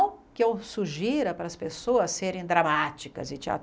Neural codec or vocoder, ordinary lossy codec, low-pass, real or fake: none; none; none; real